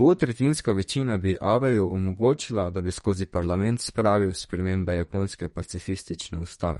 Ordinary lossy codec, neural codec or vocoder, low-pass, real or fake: MP3, 48 kbps; codec, 32 kHz, 1.9 kbps, SNAC; 14.4 kHz; fake